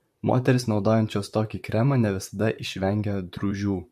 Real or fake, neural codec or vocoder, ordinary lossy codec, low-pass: fake; vocoder, 44.1 kHz, 128 mel bands, Pupu-Vocoder; MP3, 64 kbps; 14.4 kHz